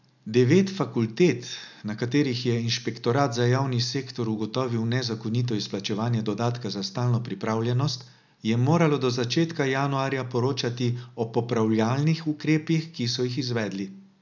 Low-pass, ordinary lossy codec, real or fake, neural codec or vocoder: 7.2 kHz; none; real; none